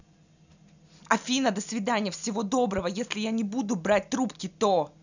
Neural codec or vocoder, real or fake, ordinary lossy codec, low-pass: none; real; none; 7.2 kHz